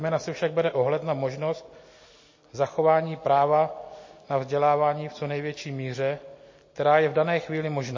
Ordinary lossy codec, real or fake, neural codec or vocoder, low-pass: MP3, 32 kbps; real; none; 7.2 kHz